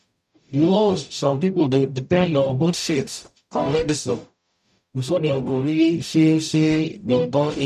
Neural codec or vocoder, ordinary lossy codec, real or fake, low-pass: codec, 44.1 kHz, 0.9 kbps, DAC; none; fake; 14.4 kHz